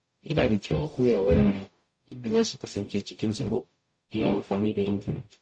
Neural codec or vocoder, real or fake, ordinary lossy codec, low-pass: codec, 44.1 kHz, 0.9 kbps, DAC; fake; MP3, 48 kbps; 9.9 kHz